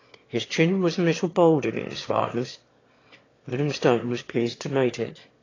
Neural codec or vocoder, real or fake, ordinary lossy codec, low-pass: autoencoder, 22.05 kHz, a latent of 192 numbers a frame, VITS, trained on one speaker; fake; AAC, 32 kbps; 7.2 kHz